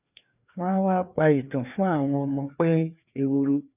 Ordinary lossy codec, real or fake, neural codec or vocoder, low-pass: none; fake; codec, 16 kHz, 2 kbps, FreqCodec, larger model; 3.6 kHz